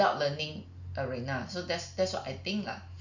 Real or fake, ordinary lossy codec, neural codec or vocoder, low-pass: real; none; none; 7.2 kHz